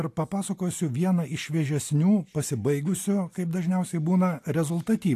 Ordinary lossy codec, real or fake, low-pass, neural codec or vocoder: AAC, 64 kbps; real; 14.4 kHz; none